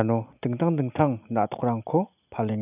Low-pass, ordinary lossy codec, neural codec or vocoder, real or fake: 3.6 kHz; none; none; real